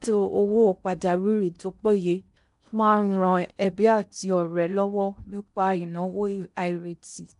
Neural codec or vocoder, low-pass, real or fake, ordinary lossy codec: codec, 16 kHz in and 24 kHz out, 0.6 kbps, FocalCodec, streaming, 4096 codes; 10.8 kHz; fake; none